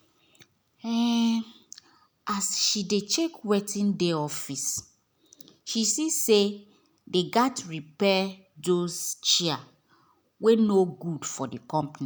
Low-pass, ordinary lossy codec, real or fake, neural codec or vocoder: none; none; real; none